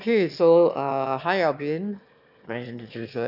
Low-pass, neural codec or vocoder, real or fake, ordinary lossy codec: 5.4 kHz; autoencoder, 22.05 kHz, a latent of 192 numbers a frame, VITS, trained on one speaker; fake; none